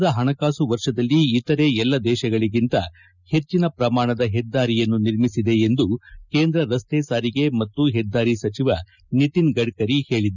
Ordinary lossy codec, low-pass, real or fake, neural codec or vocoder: none; 7.2 kHz; real; none